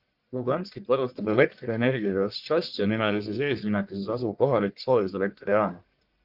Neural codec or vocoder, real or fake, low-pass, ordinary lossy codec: codec, 44.1 kHz, 1.7 kbps, Pupu-Codec; fake; 5.4 kHz; Opus, 24 kbps